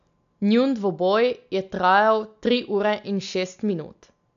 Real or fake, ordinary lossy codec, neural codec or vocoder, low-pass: real; none; none; 7.2 kHz